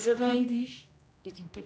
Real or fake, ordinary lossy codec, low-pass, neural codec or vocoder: fake; none; none; codec, 16 kHz, 1 kbps, X-Codec, HuBERT features, trained on general audio